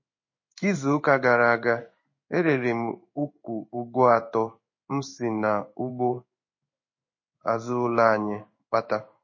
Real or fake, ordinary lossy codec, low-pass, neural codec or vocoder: fake; MP3, 32 kbps; 7.2 kHz; codec, 16 kHz in and 24 kHz out, 1 kbps, XY-Tokenizer